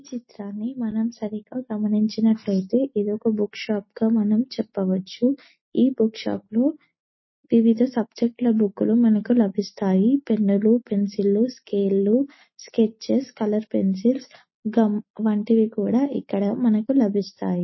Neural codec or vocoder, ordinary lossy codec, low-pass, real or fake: none; MP3, 24 kbps; 7.2 kHz; real